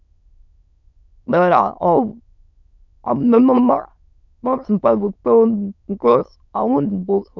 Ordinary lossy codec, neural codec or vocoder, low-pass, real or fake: none; autoencoder, 22.05 kHz, a latent of 192 numbers a frame, VITS, trained on many speakers; 7.2 kHz; fake